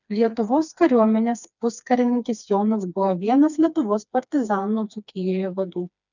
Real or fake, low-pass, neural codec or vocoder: fake; 7.2 kHz; codec, 16 kHz, 2 kbps, FreqCodec, smaller model